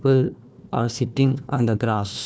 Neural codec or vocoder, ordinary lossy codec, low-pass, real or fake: codec, 16 kHz, 1 kbps, FunCodec, trained on Chinese and English, 50 frames a second; none; none; fake